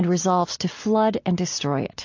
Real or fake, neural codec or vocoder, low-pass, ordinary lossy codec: real; none; 7.2 kHz; AAC, 48 kbps